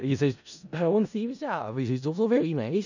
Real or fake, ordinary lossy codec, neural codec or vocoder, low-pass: fake; none; codec, 16 kHz in and 24 kHz out, 0.4 kbps, LongCat-Audio-Codec, four codebook decoder; 7.2 kHz